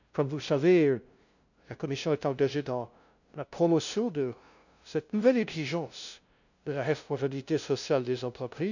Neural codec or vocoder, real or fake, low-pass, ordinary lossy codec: codec, 16 kHz, 0.5 kbps, FunCodec, trained on LibriTTS, 25 frames a second; fake; 7.2 kHz; none